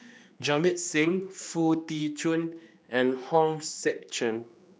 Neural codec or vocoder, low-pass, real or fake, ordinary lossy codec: codec, 16 kHz, 2 kbps, X-Codec, HuBERT features, trained on general audio; none; fake; none